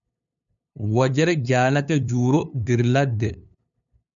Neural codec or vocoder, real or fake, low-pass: codec, 16 kHz, 2 kbps, FunCodec, trained on LibriTTS, 25 frames a second; fake; 7.2 kHz